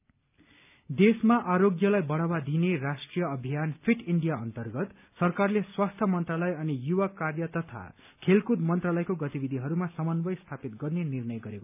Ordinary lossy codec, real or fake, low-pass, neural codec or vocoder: none; real; 3.6 kHz; none